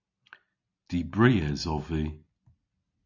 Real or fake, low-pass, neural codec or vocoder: real; 7.2 kHz; none